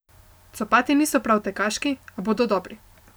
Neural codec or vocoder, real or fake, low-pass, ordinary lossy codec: none; real; none; none